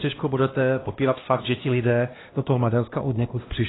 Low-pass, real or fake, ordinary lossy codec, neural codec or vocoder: 7.2 kHz; fake; AAC, 16 kbps; codec, 16 kHz, 1 kbps, X-Codec, HuBERT features, trained on LibriSpeech